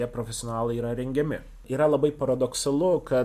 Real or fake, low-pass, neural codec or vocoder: real; 14.4 kHz; none